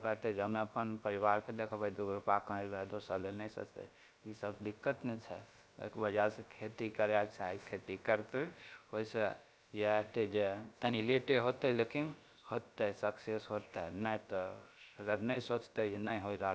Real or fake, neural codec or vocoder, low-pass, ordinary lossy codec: fake; codec, 16 kHz, about 1 kbps, DyCAST, with the encoder's durations; none; none